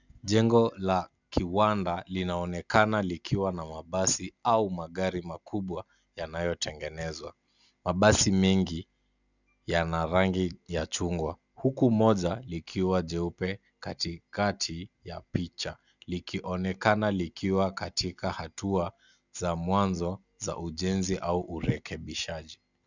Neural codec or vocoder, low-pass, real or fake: none; 7.2 kHz; real